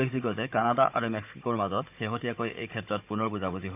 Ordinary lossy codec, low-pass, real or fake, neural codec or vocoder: AAC, 32 kbps; 3.6 kHz; fake; codec, 16 kHz, 16 kbps, FunCodec, trained on Chinese and English, 50 frames a second